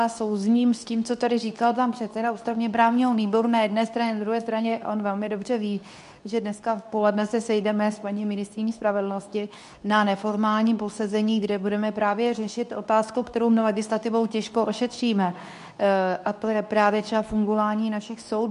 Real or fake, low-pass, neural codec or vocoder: fake; 10.8 kHz; codec, 24 kHz, 0.9 kbps, WavTokenizer, medium speech release version 2